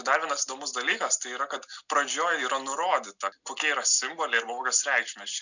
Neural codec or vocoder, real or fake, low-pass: none; real; 7.2 kHz